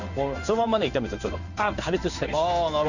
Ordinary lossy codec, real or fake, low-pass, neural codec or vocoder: none; fake; 7.2 kHz; codec, 16 kHz in and 24 kHz out, 1 kbps, XY-Tokenizer